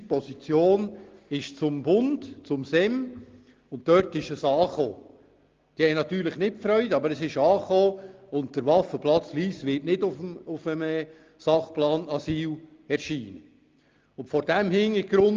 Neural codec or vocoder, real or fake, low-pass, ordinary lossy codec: none; real; 7.2 kHz; Opus, 24 kbps